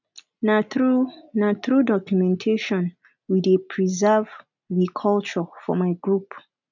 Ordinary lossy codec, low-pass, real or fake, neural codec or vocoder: none; 7.2 kHz; real; none